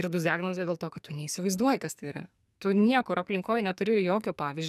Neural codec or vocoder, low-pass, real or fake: codec, 44.1 kHz, 2.6 kbps, SNAC; 14.4 kHz; fake